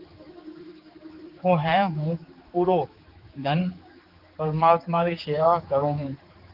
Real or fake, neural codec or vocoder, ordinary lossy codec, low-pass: fake; codec, 16 kHz, 4 kbps, X-Codec, HuBERT features, trained on general audio; Opus, 32 kbps; 5.4 kHz